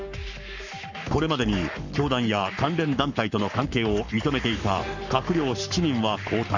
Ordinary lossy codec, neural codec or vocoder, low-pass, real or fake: none; codec, 44.1 kHz, 7.8 kbps, Pupu-Codec; 7.2 kHz; fake